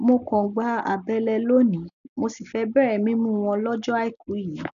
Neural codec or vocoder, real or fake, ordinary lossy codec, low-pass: none; real; none; 7.2 kHz